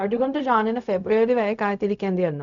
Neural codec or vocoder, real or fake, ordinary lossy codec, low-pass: codec, 16 kHz, 0.4 kbps, LongCat-Audio-Codec; fake; none; 7.2 kHz